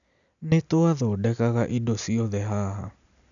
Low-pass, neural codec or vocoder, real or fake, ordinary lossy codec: 7.2 kHz; none; real; none